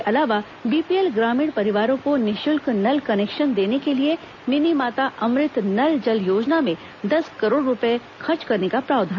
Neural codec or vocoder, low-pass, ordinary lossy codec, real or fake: none; 7.2 kHz; none; real